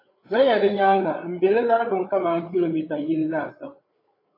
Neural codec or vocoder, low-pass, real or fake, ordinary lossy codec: codec, 16 kHz, 8 kbps, FreqCodec, larger model; 5.4 kHz; fake; AAC, 24 kbps